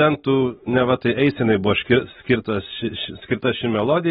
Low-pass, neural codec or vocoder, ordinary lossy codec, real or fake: 19.8 kHz; none; AAC, 16 kbps; real